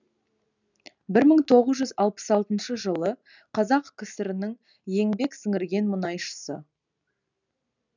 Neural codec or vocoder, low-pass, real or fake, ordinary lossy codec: none; 7.2 kHz; real; none